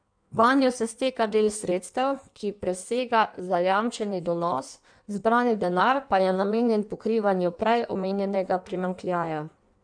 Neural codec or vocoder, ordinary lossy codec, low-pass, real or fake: codec, 16 kHz in and 24 kHz out, 1.1 kbps, FireRedTTS-2 codec; none; 9.9 kHz; fake